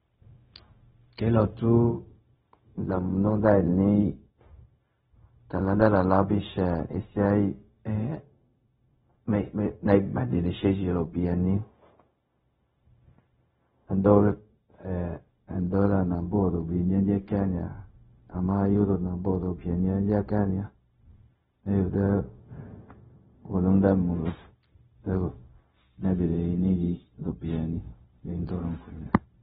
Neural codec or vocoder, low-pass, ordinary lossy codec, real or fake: codec, 16 kHz, 0.4 kbps, LongCat-Audio-Codec; 7.2 kHz; AAC, 16 kbps; fake